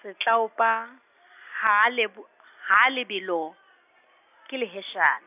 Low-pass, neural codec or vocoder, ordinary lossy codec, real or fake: 3.6 kHz; none; none; real